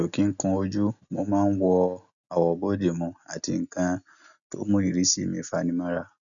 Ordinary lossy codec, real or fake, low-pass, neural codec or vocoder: none; real; 7.2 kHz; none